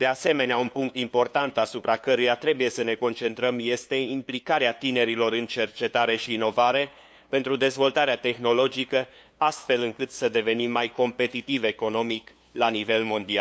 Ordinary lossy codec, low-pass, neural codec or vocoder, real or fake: none; none; codec, 16 kHz, 2 kbps, FunCodec, trained on LibriTTS, 25 frames a second; fake